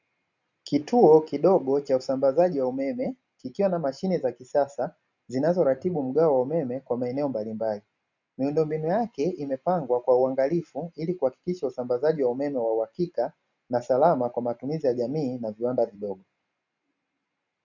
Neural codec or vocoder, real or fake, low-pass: none; real; 7.2 kHz